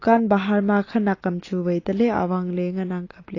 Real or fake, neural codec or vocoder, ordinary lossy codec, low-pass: real; none; AAC, 32 kbps; 7.2 kHz